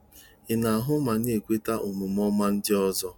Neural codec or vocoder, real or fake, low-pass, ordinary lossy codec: none; real; 19.8 kHz; none